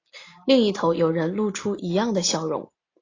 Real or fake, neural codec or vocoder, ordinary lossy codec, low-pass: real; none; AAC, 32 kbps; 7.2 kHz